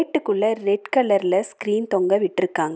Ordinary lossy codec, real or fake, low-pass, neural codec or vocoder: none; real; none; none